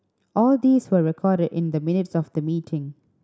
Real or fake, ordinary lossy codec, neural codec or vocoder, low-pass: real; none; none; none